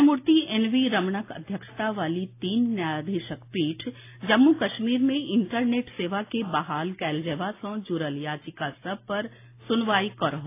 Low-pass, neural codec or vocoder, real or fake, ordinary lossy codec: 3.6 kHz; none; real; AAC, 24 kbps